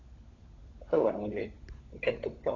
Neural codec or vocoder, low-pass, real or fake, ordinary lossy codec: codec, 16 kHz, 16 kbps, FunCodec, trained on LibriTTS, 50 frames a second; 7.2 kHz; fake; AAC, 32 kbps